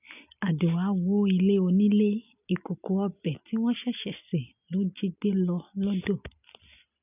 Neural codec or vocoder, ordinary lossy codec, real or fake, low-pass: none; none; real; 3.6 kHz